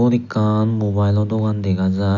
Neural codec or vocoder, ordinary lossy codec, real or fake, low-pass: none; none; real; 7.2 kHz